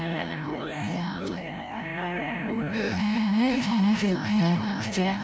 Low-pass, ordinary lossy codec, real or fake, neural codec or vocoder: none; none; fake; codec, 16 kHz, 0.5 kbps, FreqCodec, larger model